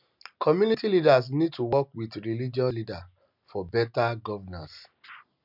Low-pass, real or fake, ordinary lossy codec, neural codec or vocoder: 5.4 kHz; real; none; none